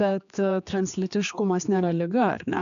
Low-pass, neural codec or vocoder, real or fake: 7.2 kHz; codec, 16 kHz, 2 kbps, X-Codec, HuBERT features, trained on general audio; fake